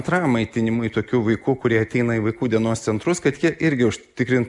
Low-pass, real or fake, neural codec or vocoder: 10.8 kHz; real; none